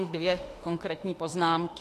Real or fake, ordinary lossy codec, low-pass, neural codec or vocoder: fake; AAC, 48 kbps; 14.4 kHz; autoencoder, 48 kHz, 32 numbers a frame, DAC-VAE, trained on Japanese speech